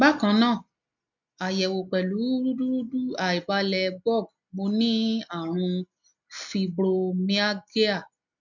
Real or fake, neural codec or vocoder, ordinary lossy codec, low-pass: real; none; none; 7.2 kHz